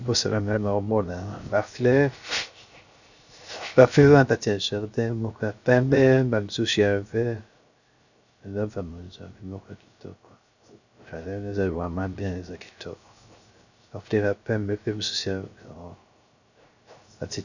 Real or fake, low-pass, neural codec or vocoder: fake; 7.2 kHz; codec, 16 kHz, 0.3 kbps, FocalCodec